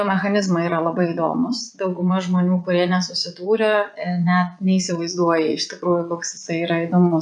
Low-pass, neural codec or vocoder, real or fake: 9.9 kHz; vocoder, 22.05 kHz, 80 mel bands, Vocos; fake